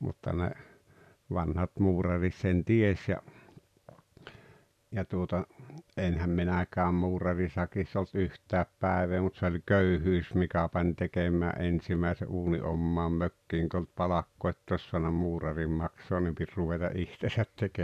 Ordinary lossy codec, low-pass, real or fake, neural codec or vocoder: none; 14.4 kHz; real; none